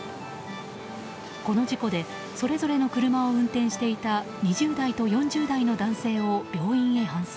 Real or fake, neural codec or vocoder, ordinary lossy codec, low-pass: real; none; none; none